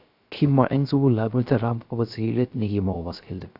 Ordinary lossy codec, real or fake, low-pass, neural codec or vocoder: AAC, 48 kbps; fake; 5.4 kHz; codec, 16 kHz, 0.3 kbps, FocalCodec